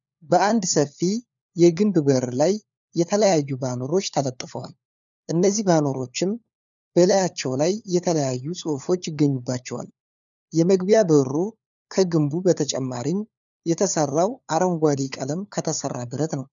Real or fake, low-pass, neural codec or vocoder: fake; 7.2 kHz; codec, 16 kHz, 4 kbps, FunCodec, trained on LibriTTS, 50 frames a second